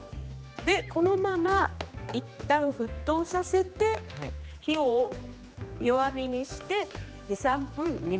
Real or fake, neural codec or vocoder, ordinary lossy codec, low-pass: fake; codec, 16 kHz, 2 kbps, X-Codec, HuBERT features, trained on general audio; none; none